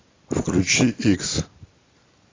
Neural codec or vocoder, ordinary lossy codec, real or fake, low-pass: none; AAC, 32 kbps; real; 7.2 kHz